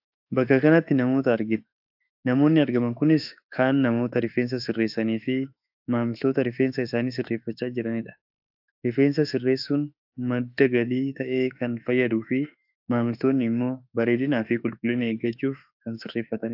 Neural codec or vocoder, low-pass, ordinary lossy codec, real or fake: autoencoder, 48 kHz, 32 numbers a frame, DAC-VAE, trained on Japanese speech; 5.4 kHz; AAC, 48 kbps; fake